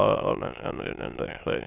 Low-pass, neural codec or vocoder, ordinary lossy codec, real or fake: 3.6 kHz; autoencoder, 22.05 kHz, a latent of 192 numbers a frame, VITS, trained on many speakers; none; fake